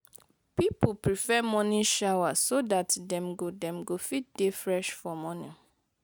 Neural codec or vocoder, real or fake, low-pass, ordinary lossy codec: none; real; none; none